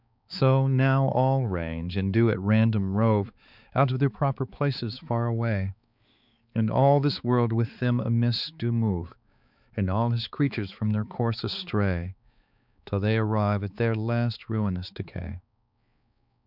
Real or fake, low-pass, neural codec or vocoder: fake; 5.4 kHz; codec, 16 kHz, 4 kbps, X-Codec, HuBERT features, trained on balanced general audio